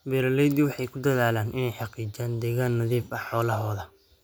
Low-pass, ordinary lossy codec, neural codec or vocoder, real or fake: none; none; none; real